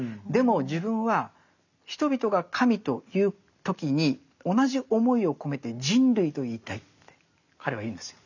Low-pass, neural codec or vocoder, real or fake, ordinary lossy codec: 7.2 kHz; none; real; none